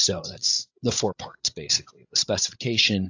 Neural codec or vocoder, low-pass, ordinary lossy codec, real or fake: codec, 16 kHz, 16 kbps, FreqCodec, larger model; 7.2 kHz; AAC, 48 kbps; fake